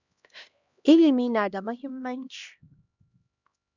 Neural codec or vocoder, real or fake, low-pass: codec, 16 kHz, 1 kbps, X-Codec, HuBERT features, trained on LibriSpeech; fake; 7.2 kHz